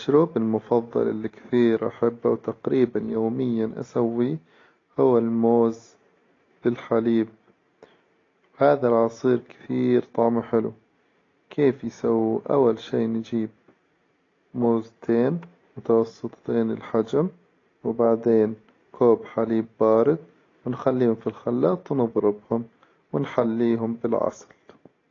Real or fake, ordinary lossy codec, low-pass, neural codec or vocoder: real; AAC, 32 kbps; 7.2 kHz; none